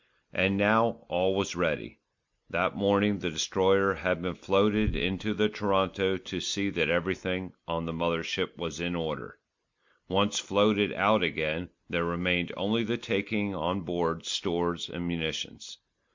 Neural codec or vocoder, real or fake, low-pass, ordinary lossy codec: none; real; 7.2 kHz; MP3, 64 kbps